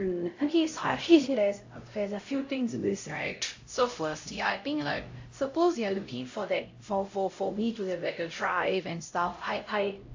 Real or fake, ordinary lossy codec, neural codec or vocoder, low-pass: fake; AAC, 48 kbps; codec, 16 kHz, 0.5 kbps, X-Codec, HuBERT features, trained on LibriSpeech; 7.2 kHz